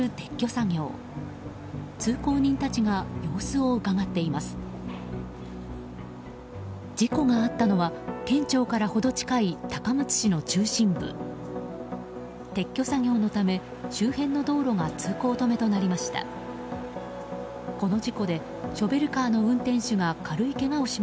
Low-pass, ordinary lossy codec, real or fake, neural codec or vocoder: none; none; real; none